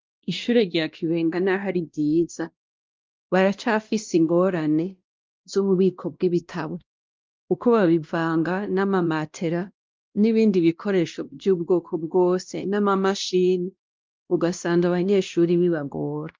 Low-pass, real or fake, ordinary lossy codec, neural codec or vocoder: 7.2 kHz; fake; Opus, 24 kbps; codec, 16 kHz, 1 kbps, X-Codec, WavLM features, trained on Multilingual LibriSpeech